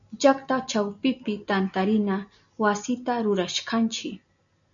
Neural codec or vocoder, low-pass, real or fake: none; 7.2 kHz; real